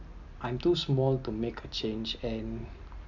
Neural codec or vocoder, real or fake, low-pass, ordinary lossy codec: none; real; 7.2 kHz; none